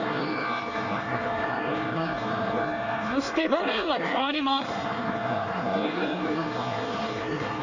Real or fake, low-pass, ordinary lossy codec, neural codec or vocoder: fake; 7.2 kHz; AAC, 48 kbps; codec, 24 kHz, 1 kbps, SNAC